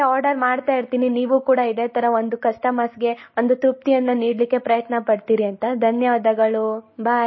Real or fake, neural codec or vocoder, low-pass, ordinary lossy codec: real; none; 7.2 kHz; MP3, 24 kbps